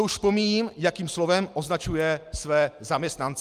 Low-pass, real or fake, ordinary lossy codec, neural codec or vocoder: 14.4 kHz; real; Opus, 32 kbps; none